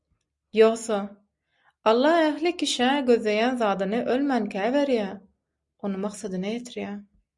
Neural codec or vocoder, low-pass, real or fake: none; 10.8 kHz; real